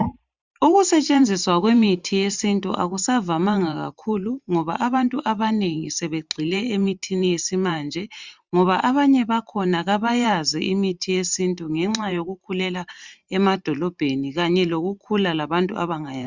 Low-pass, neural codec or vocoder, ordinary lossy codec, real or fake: 7.2 kHz; vocoder, 44.1 kHz, 128 mel bands every 512 samples, BigVGAN v2; Opus, 64 kbps; fake